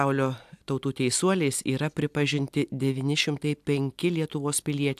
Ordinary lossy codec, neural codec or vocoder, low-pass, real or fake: AAC, 96 kbps; none; 14.4 kHz; real